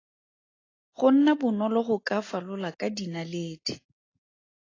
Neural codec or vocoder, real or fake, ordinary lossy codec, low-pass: none; real; AAC, 32 kbps; 7.2 kHz